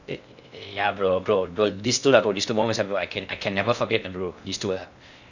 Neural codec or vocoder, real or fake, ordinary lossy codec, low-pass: codec, 16 kHz in and 24 kHz out, 0.6 kbps, FocalCodec, streaming, 2048 codes; fake; none; 7.2 kHz